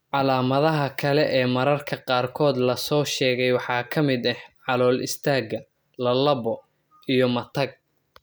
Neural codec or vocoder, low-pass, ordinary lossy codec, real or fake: none; none; none; real